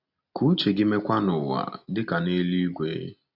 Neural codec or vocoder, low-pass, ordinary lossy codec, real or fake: none; 5.4 kHz; none; real